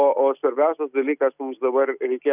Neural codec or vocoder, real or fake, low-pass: none; real; 3.6 kHz